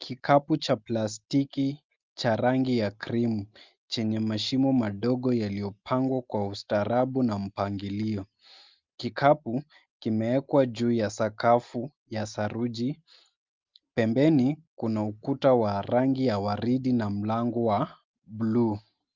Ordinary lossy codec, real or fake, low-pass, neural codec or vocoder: Opus, 24 kbps; real; 7.2 kHz; none